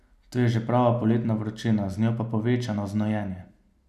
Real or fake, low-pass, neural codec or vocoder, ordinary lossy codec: real; 14.4 kHz; none; none